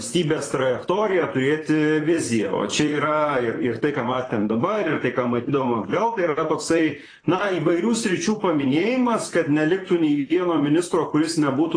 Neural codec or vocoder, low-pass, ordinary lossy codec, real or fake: vocoder, 44.1 kHz, 128 mel bands, Pupu-Vocoder; 9.9 kHz; AAC, 32 kbps; fake